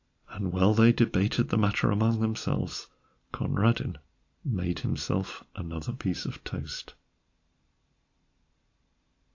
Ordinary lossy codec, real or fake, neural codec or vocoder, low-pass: AAC, 48 kbps; real; none; 7.2 kHz